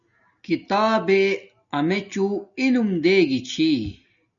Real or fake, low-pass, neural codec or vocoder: real; 7.2 kHz; none